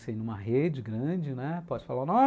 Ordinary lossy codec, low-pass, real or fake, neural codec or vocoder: none; none; real; none